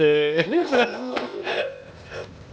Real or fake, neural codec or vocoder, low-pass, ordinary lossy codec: fake; codec, 16 kHz, 0.8 kbps, ZipCodec; none; none